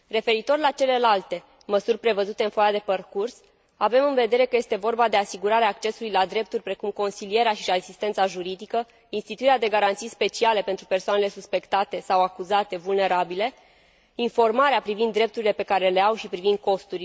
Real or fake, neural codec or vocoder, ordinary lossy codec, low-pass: real; none; none; none